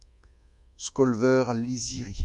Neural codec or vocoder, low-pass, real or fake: codec, 24 kHz, 1.2 kbps, DualCodec; 10.8 kHz; fake